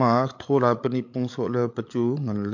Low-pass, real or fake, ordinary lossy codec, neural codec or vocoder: 7.2 kHz; fake; MP3, 48 kbps; codec, 16 kHz, 8 kbps, FreqCodec, larger model